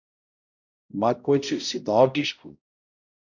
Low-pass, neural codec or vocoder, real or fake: 7.2 kHz; codec, 16 kHz, 0.5 kbps, X-Codec, HuBERT features, trained on balanced general audio; fake